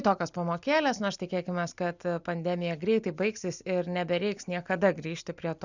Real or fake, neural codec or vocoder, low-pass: real; none; 7.2 kHz